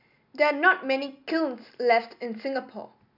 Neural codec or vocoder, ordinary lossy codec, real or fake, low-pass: none; none; real; 5.4 kHz